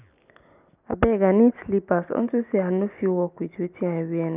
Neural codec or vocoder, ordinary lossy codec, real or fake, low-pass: none; none; real; 3.6 kHz